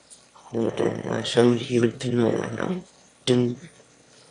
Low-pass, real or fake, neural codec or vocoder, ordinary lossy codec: 9.9 kHz; fake; autoencoder, 22.05 kHz, a latent of 192 numbers a frame, VITS, trained on one speaker; AAC, 64 kbps